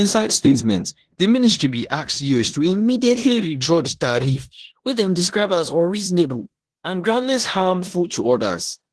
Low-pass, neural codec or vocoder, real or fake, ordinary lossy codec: 10.8 kHz; codec, 16 kHz in and 24 kHz out, 0.9 kbps, LongCat-Audio-Codec, four codebook decoder; fake; Opus, 16 kbps